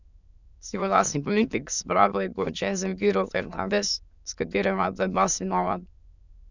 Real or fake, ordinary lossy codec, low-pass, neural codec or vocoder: fake; none; 7.2 kHz; autoencoder, 22.05 kHz, a latent of 192 numbers a frame, VITS, trained on many speakers